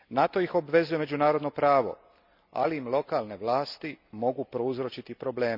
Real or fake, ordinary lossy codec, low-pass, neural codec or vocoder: real; none; 5.4 kHz; none